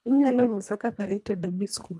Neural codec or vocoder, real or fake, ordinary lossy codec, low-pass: codec, 24 kHz, 1.5 kbps, HILCodec; fake; none; none